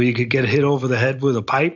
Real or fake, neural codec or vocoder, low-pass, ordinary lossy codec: real; none; 7.2 kHz; AAC, 48 kbps